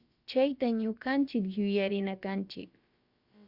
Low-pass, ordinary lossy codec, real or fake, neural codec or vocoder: 5.4 kHz; Opus, 64 kbps; fake; codec, 16 kHz, about 1 kbps, DyCAST, with the encoder's durations